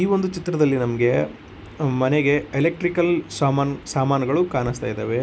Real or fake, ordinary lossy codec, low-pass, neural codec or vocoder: real; none; none; none